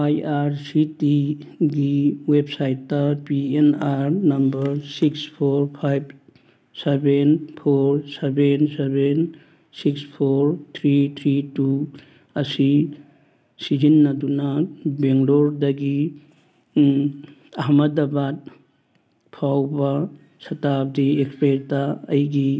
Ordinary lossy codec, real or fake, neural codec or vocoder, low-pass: none; real; none; none